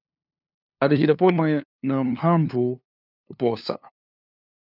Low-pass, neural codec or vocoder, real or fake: 5.4 kHz; codec, 16 kHz, 2 kbps, FunCodec, trained on LibriTTS, 25 frames a second; fake